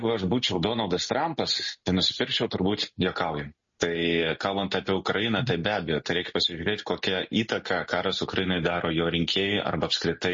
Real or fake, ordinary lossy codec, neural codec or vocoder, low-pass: real; MP3, 32 kbps; none; 7.2 kHz